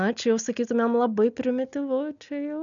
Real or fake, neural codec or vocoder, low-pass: real; none; 7.2 kHz